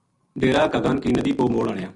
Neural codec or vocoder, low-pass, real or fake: none; 10.8 kHz; real